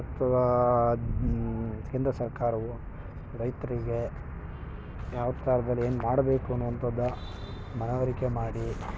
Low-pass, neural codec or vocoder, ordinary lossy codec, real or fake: none; none; none; real